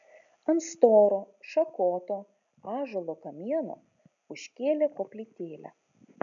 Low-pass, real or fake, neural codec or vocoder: 7.2 kHz; real; none